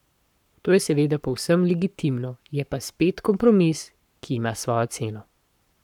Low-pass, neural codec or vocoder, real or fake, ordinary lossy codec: 19.8 kHz; codec, 44.1 kHz, 7.8 kbps, Pupu-Codec; fake; none